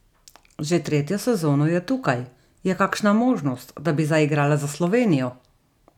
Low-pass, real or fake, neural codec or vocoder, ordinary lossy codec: 19.8 kHz; real; none; none